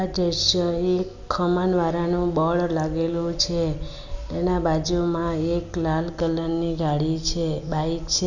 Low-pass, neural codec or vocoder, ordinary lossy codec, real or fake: 7.2 kHz; none; none; real